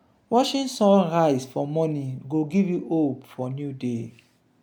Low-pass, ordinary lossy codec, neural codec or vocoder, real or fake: 19.8 kHz; none; none; real